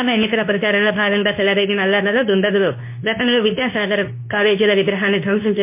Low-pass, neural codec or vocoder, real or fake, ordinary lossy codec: 3.6 kHz; codec, 24 kHz, 0.9 kbps, WavTokenizer, medium speech release version 2; fake; MP3, 24 kbps